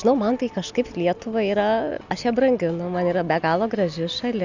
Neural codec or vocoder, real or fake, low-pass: none; real; 7.2 kHz